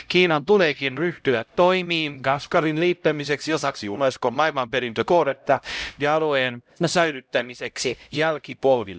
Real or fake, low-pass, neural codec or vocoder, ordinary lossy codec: fake; none; codec, 16 kHz, 0.5 kbps, X-Codec, HuBERT features, trained on LibriSpeech; none